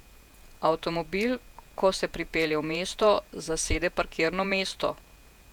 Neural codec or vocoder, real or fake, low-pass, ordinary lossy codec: vocoder, 48 kHz, 128 mel bands, Vocos; fake; 19.8 kHz; none